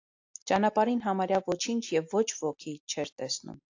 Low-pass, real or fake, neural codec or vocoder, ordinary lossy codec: 7.2 kHz; real; none; AAC, 48 kbps